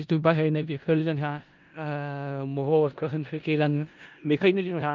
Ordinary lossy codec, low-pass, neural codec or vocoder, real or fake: Opus, 24 kbps; 7.2 kHz; codec, 16 kHz in and 24 kHz out, 0.4 kbps, LongCat-Audio-Codec, four codebook decoder; fake